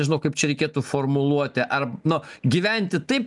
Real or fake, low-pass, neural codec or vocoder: real; 10.8 kHz; none